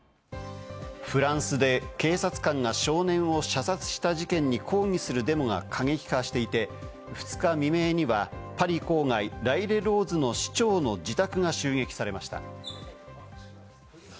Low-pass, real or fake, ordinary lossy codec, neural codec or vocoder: none; real; none; none